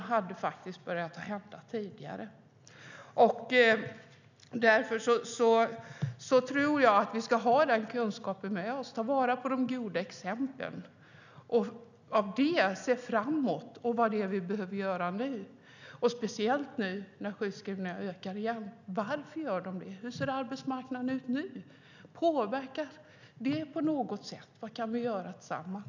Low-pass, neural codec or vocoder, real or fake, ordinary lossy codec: 7.2 kHz; none; real; none